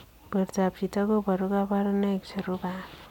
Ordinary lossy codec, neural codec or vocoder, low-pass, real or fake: none; none; 19.8 kHz; real